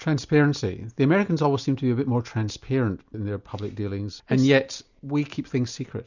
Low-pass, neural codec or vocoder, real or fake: 7.2 kHz; none; real